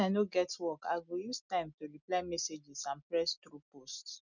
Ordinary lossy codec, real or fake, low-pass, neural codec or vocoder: none; real; 7.2 kHz; none